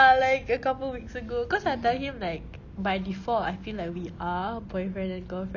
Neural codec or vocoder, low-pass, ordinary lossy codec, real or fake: none; 7.2 kHz; none; real